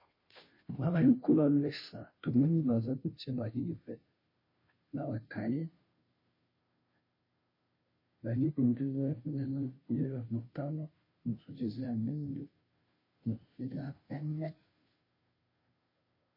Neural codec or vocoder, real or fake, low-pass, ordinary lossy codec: codec, 16 kHz, 0.5 kbps, FunCodec, trained on Chinese and English, 25 frames a second; fake; 5.4 kHz; MP3, 24 kbps